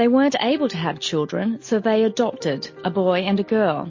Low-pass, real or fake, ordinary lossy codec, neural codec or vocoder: 7.2 kHz; real; MP3, 32 kbps; none